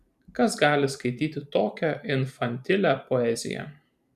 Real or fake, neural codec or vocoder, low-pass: fake; vocoder, 44.1 kHz, 128 mel bands every 512 samples, BigVGAN v2; 14.4 kHz